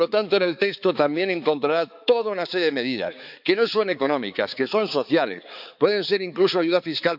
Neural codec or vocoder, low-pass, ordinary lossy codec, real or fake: codec, 16 kHz, 4 kbps, X-Codec, HuBERT features, trained on balanced general audio; 5.4 kHz; none; fake